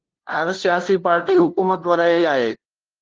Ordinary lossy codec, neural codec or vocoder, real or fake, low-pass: Opus, 16 kbps; codec, 16 kHz, 0.5 kbps, FunCodec, trained on LibriTTS, 25 frames a second; fake; 7.2 kHz